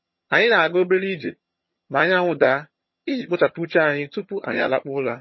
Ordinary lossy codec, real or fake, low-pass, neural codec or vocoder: MP3, 24 kbps; fake; 7.2 kHz; vocoder, 22.05 kHz, 80 mel bands, HiFi-GAN